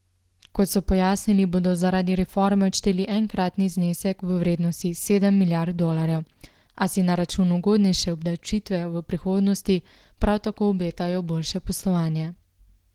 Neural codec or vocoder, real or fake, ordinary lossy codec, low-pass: none; real; Opus, 16 kbps; 19.8 kHz